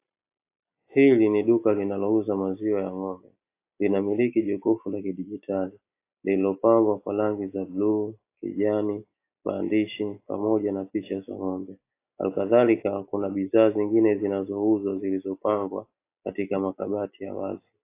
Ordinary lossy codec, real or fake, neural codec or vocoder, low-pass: AAC, 24 kbps; real; none; 3.6 kHz